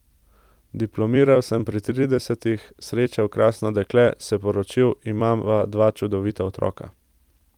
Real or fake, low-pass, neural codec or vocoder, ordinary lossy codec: fake; 19.8 kHz; vocoder, 44.1 kHz, 128 mel bands every 256 samples, BigVGAN v2; Opus, 32 kbps